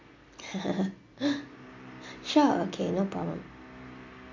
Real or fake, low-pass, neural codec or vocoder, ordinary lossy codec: real; 7.2 kHz; none; MP3, 48 kbps